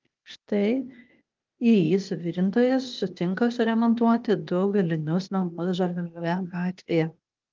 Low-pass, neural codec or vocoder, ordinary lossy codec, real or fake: 7.2 kHz; codec, 16 kHz, 0.8 kbps, ZipCodec; Opus, 32 kbps; fake